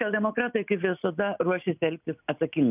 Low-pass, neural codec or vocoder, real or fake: 3.6 kHz; none; real